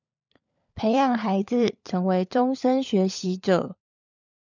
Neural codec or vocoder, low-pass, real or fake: codec, 16 kHz, 16 kbps, FunCodec, trained on LibriTTS, 50 frames a second; 7.2 kHz; fake